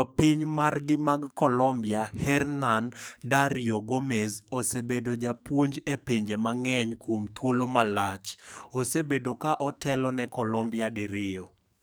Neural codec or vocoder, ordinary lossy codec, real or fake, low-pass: codec, 44.1 kHz, 2.6 kbps, SNAC; none; fake; none